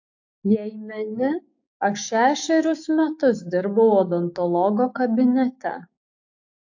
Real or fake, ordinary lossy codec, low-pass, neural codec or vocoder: fake; AAC, 48 kbps; 7.2 kHz; codec, 16 kHz, 6 kbps, DAC